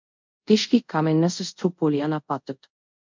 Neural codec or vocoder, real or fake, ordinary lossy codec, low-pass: codec, 24 kHz, 0.5 kbps, DualCodec; fake; MP3, 64 kbps; 7.2 kHz